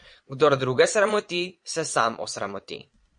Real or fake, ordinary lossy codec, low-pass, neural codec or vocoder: fake; MP3, 48 kbps; 9.9 kHz; vocoder, 22.05 kHz, 80 mel bands, Vocos